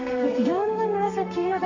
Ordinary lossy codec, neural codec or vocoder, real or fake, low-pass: none; codec, 32 kHz, 1.9 kbps, SNAC; fake; 7.2 kHz